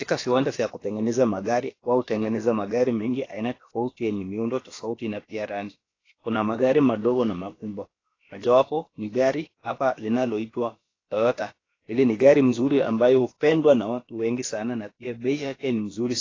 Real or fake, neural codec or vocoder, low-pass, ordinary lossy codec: fake; codec, 16 kHz, about 1 kbps, DyCAST, with the encoder's durations; 7.2 kHz; AAC, 32 kbps